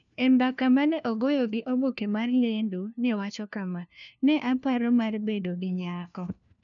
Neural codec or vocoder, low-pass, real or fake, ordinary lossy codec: codec, 16 kHz, 1 kbps, FunCodec, trained on LibriTTS, 50 frames a second; 7.2 kHz; fake; none